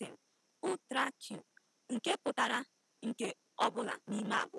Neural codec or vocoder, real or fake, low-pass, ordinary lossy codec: none; real; none; none